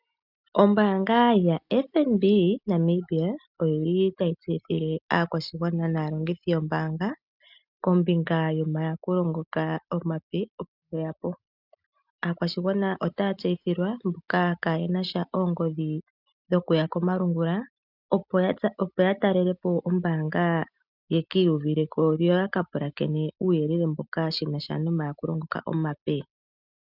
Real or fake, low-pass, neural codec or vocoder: real; 5.4 kHz; none